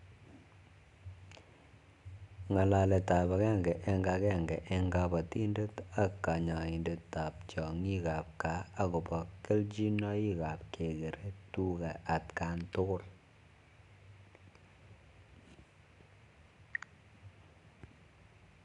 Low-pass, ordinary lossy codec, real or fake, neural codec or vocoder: 10.8 kHz; none; real; none